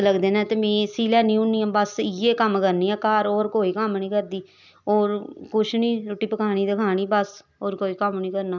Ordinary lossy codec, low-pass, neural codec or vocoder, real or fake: none; 7.2 kHz; none; real